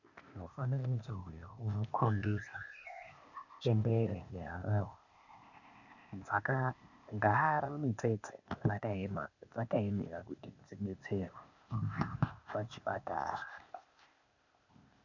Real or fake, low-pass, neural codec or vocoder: fake; 7.2 kHz; codec, 16 kHz, 0.8 kbps, ZipCodec